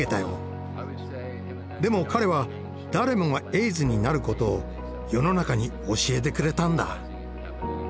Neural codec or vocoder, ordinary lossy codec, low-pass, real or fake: none; none; none; real